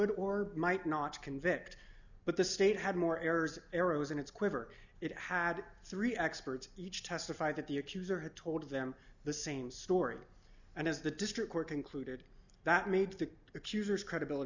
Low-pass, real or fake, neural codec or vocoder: 7.2 kHz; real; none